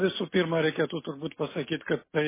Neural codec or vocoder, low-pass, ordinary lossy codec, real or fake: none; 3.6 kHz; MP3, 16 kbps; real